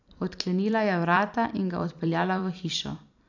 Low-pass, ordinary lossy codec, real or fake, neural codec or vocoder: 7.2 kHz; none; fake; vocoder, 44.1 kHz, 128 mel bands every 256 samples, BigVGAN v2